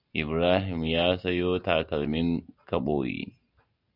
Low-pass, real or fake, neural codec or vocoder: 5.4 kHz; real; none